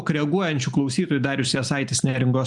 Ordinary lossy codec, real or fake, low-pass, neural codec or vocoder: MP3, 96 kbps; real; 10.8 kHz; none